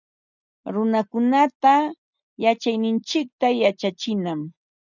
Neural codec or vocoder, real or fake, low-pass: none; real; 7.2 kHz